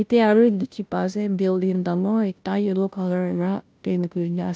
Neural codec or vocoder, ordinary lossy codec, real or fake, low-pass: codec, 16 kHz, 0.5 kbps, FunCodec, trained on Chinese and English, 25 frames a second; none; fake; none